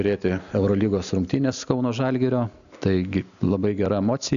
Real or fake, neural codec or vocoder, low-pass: real; none; 7.2 kHz